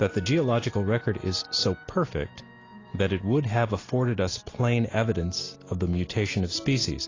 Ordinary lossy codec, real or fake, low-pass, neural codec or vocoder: AAC, 32 kbps; real; 7.2 kHz; none